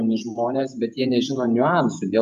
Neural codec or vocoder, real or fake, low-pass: vocoder, 48 kHz, 128 mel bands, Vocos; fake; 14.4 kHz